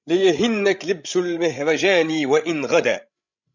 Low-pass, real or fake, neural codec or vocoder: 7.2 kHz; fake; codec, 16 kHz, 16 kbps, FreqCodec, larger model